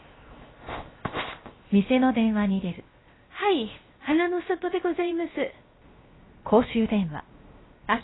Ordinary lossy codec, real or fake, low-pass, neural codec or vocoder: AAC, 16 kbps; fake; 7.2 kHz; codec, 16 kHz, 0.7 kbps, FocalCodec